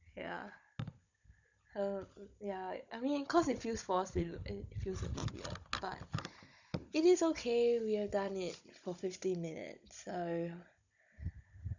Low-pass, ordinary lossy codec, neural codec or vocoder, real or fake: 7.2 kHz; none; codec, 16 kHz, 16 kbps, FunCodec, trained on Chinese and English, 50 frames a second; fake